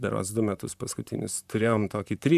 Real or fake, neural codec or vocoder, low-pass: fake; vocoder, 44.1 kHz, 128 mel bands, Pupu-Vocoder; 14.4 kHz